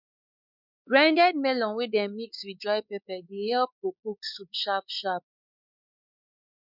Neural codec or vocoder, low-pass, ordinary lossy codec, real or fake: codec, 16 kHz, 4 kbps, X-Codec, WavLM features, trained on Multilingual LibriSpeech; 5.4 kHz; none; fake